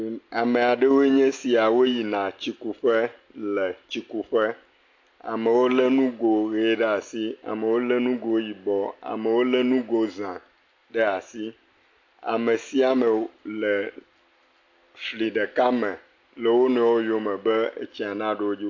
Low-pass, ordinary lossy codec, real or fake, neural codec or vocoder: 7.2 kHz; AAC, 48 kbps; real; none